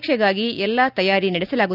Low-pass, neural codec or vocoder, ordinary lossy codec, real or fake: 5.4 kHz; none; none; real